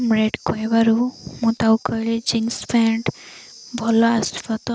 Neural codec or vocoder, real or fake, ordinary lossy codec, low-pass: none; real; none; none